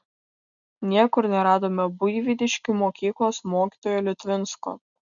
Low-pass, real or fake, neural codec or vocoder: 7.2 kHz; real; none